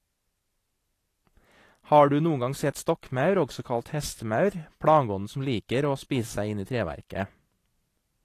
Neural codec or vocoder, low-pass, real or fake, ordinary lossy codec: vocoder, 44.1 kHz, 128 mel bands every 512 samples, BigVGAN v2; 14.4 kHz; fake; AAC, 48 kbps